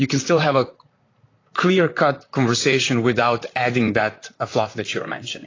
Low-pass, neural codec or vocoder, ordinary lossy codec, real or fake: 7.2 kHz; vocoder, 44.1 kHz, 128 mel bands, Pupu-Vocoder; AAC, 32 kbps; fake